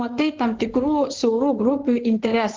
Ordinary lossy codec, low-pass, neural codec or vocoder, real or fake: Opus, 16 kbps; 7.2 kHz; codec, 16 kHz in and 24 kHz out, 2.2 kbps, FireRedTTS-2 codec; fake